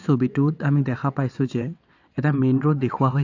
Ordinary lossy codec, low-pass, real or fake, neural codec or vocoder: AAC, 48 kbps; 7.2 kHz; fake; vocoder, 22.05 kHz, 80 mel bands, WaveNeXt